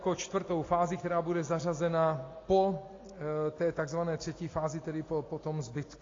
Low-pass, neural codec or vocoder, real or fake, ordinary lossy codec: 7.2 kHz; none; real; AAC, 32 kbps